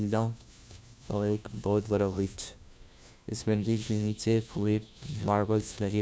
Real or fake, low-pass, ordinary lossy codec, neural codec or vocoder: fake; none; none; codec, 16 kHz, 1 kbps, FunCodec, trained on LibriTTS, 50 frames a second